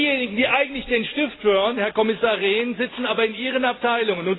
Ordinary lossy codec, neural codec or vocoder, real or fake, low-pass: AAC, 16 kbps; none; real; 7.2 kHz